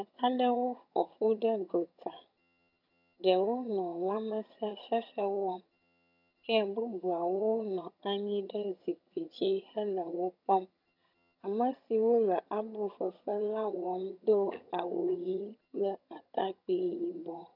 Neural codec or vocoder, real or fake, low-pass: vocoder, 22.05 kHz, 80 mel bands, HiFi-GAN; fake; 5.4 kHz